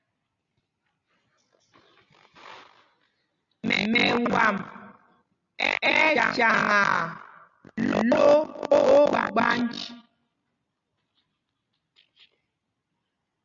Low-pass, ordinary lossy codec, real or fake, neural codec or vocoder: 7.2 kHz; Opus, 64 kbps; real; none